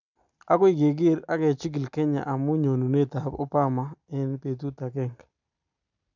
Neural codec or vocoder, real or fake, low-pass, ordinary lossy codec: none; real; 7.2 kHz; none